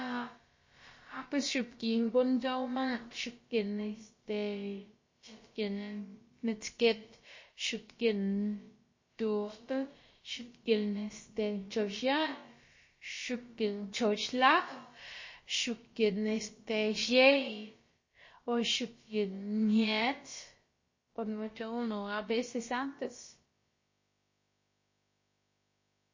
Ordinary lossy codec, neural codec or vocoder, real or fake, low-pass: MP3, 32 kbps; codec, 16 kHz, about 1 kbps, DyCAST, with the encoder's durations; fake; 7.2 kHz